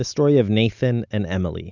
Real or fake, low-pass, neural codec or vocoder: real; 7.2 kHz; none